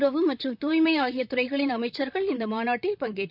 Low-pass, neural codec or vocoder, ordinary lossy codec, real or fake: 5.4 kHz; vocoder, 44.1 kHz, 128 mel bands, Pupu-Vocoder; none; fake